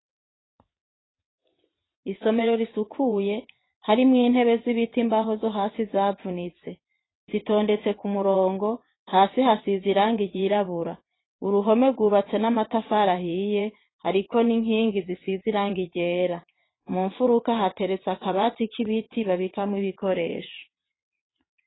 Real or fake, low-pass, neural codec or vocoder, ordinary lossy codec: fake; 7.2 kHz; vocoder, 22.05 kHz, 80 mel bands, WaveNeXt; AAC, 16 kbps